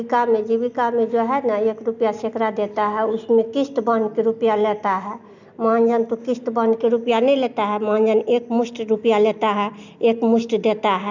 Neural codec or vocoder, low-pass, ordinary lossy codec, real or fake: none; 7.2 kHz; none; real